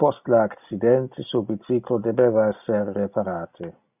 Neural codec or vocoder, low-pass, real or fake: none; 3.6 kHz; real